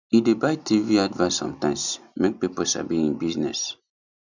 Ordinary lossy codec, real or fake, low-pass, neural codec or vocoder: none; real; 7.2 kHz; none